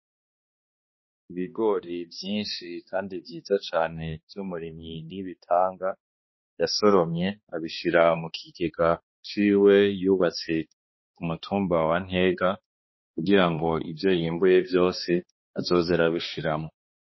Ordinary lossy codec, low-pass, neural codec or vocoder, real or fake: MP3, 24 kbps; 7.2 kHz; codec, 16 kHz, 2 kbps, X-Codec, HuBERT features, trained on balanced general audio; fake